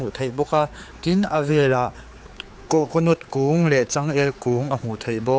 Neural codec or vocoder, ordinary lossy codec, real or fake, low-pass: codec, 16 kHz, 4 kbps, X-Codec, HuBERT features, trained on general audio; none; fake; none